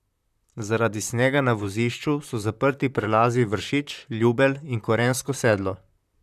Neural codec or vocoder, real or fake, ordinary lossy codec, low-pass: vocoder, 44.1 kHz, 128 mel bands, Pupu-Vocoder; fake; none; 14.4 kHz